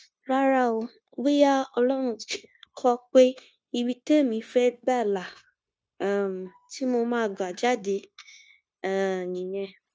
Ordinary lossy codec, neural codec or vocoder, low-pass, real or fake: none; codec, 16 kHz, 0.9 kbps, LongCat-Audio-Codec; none; fake